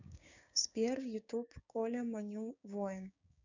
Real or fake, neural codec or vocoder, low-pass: fake; codec, 16 kHz, 6 kbps, DAC; 7.2 kHz